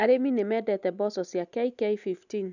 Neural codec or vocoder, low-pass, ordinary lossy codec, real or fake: none; 7.2 kHz; none; real